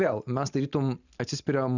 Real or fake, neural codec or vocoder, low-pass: real; none; 7.2 kHz